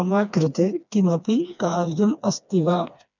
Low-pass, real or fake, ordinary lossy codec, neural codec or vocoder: 7.2 kHz; fake; none; codec, 16 kHz, 2 kbps, FreqCodec, smaller model